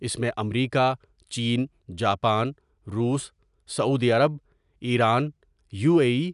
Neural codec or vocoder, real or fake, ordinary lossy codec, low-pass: none; real; none; 10.8 kHz